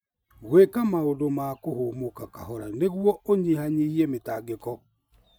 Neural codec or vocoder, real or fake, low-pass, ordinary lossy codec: none; real; none; none